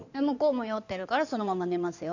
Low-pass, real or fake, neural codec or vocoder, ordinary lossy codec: 7.2 kHz; fake; codec, 16 kHz in and 24 kHz out, 2.2 kbps, FireRedTTS-2 codec; none